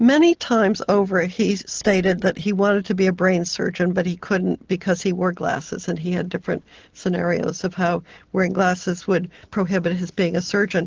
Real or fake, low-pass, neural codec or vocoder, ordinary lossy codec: real; 7.2 kHz; none; Opus, 16 kbps